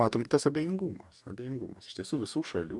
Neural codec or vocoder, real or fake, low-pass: codec, 44.1 kHz, 2.6 kbps, DAC; fake; 10.8 kHz